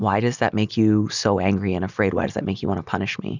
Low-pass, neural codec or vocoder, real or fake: 7.2 kHz; none; real